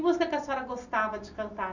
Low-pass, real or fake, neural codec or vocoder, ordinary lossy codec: 7.2 kHz; real; none; none